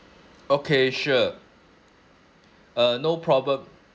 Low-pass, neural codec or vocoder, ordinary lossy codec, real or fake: none; none; none; real